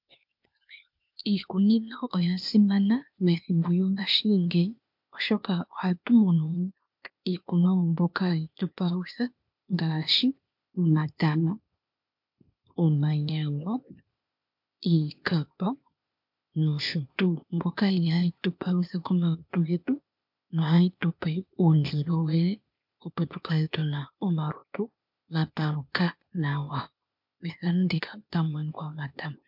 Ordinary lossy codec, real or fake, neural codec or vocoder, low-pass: MP3, 48 kbps; fake; codec, 16 kHz, 0.8 kbps, ZipCodec; 5.4 kHz